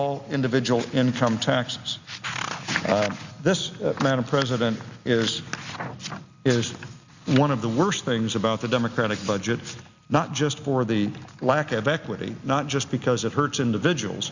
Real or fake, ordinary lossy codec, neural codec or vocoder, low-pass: real; Opus, 64 kbps; none; 7.2 kHz